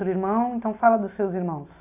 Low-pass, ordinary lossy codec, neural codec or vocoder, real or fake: 3.6 kHz; none; none; real